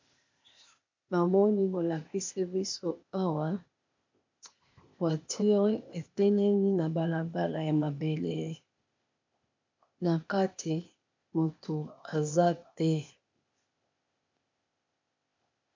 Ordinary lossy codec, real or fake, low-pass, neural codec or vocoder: MP3, 48 kbps; fake; 7.2 kHz; codec, 16 kHz, 0.8 kbps, ZipCodec